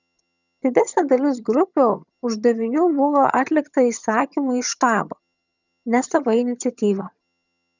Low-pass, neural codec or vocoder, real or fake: 7.2 kHz; vocoder, 22.05 kHz, 80 mel bands, HiFi-GAN; fake